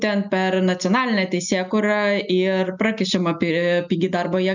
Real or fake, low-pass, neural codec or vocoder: real; 7.2 kHz; none